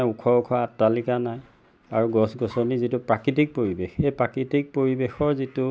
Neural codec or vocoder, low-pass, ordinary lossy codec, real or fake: none; none; none; real